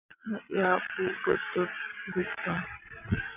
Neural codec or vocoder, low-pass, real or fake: vocoder, 22.05 kHz, 80 mel bands, Vocos; 3.6 kHz; fake